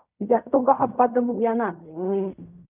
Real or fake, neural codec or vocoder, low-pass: fake; codec, 16 kHz in and 24 kHz out, 0.4 kbps, LongCat-Audio-Codec, fine tuned four codebook decoder; 3.6 kHz